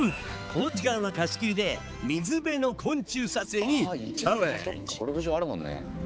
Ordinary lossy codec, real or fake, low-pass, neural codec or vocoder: none; fake; none; codec, 16 kHz, 4 kbps, X-Codec, HuBERT features, trained on balanced general audio